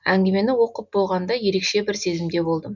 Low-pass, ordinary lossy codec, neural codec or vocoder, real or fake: 7.2 kHz; none; none; real